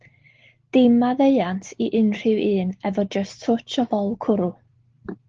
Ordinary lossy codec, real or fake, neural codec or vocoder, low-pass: Opus, 16 kbps; real; none; 7.2 kHz